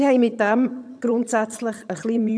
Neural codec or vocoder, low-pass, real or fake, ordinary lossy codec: vocoder, 22.05 kHz, 80 mel bands, HiFi-GAN; none; fake; none